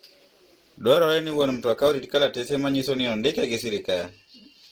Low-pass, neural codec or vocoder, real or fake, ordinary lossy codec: 19.8 kHz; vocoder, 44.1 kHz, 128 mel bands every 512 samples, BigVGAN v2; fake; Opus, 16 kbps